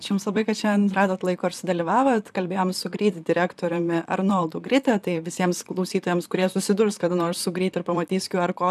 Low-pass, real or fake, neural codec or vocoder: 14.4 kHz; fake; vocoder, 44.1 kHz, 128 mel bands, Pupu-Vocoder